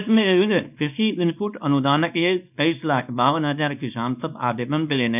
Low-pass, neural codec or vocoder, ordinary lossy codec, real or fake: 3.6 kHz; codec, 24 kHz, 0.9 kbps, WavTokenizer, small release; none; fake